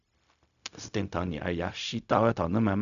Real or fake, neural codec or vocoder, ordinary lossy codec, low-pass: fake; codec, 16 kHz, 0.4 kbps, LongCat-Audio-Codec; none; 7.2 kHz